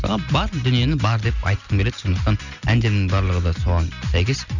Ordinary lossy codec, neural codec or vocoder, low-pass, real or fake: none; none; 7.2 kHz; real